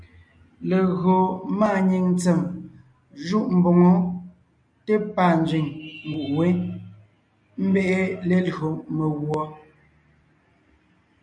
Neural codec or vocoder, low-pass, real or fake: none; 9.9 kHz; real